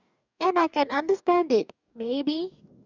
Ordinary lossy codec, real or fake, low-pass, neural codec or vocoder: none; fake; 7.2 kHz; codec, 44.1 kHz, 2.6 kbps, DAC